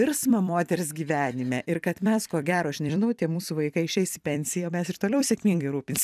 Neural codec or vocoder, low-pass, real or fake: vocoder, 44.1 kHz, 128 mel bands every 256 samples, BigVGAN v2; 14.4 kHz; fake